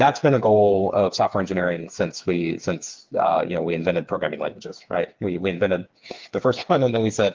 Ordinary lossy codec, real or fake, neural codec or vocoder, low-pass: Opus, 32 kbps; fake; codec, 16 kHz, 4 kbps, FreqCodec, smaller model; 7.2 kHz